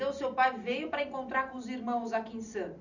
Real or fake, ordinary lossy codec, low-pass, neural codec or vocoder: real; none; 7.2 kHz; none